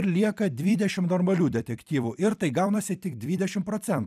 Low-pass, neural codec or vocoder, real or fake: 14.4 kHz; vocoder, 44.1 kHz, 128 mel bands every 256 samples, BigVGAN v2; fake